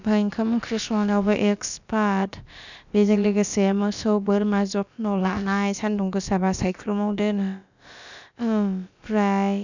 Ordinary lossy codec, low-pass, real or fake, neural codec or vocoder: none; 7.2 kHz; fake; codec, 16 kHz, about 1 kbps, DyCAST, with the encoder's durations